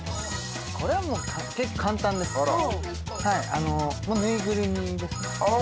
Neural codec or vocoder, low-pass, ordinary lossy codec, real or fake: none; none; none; real